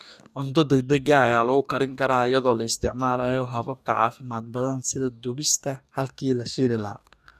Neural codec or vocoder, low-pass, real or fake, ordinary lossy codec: codec, 44.1 kHz, 2.6 kbps, DAC; 14.4 kHz; fake; none